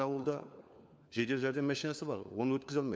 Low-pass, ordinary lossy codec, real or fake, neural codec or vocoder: none; none; fake; codec, 16 kHz, 4 kbps, FunCodec, trained on LibriTTS, 50 frames a second